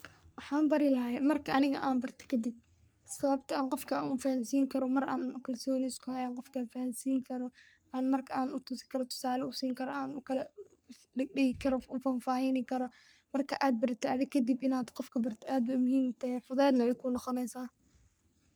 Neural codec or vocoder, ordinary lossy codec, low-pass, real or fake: codec, 44.1 kHz, 3.4 kbps, Pupu-Codec; none; none; fake